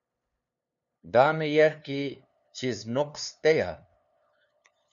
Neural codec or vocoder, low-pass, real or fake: codec, 16 kHz, 2 kbps, FunCodec, trained on LibriTTS, 25 frames a second; 7.2 kHz; fake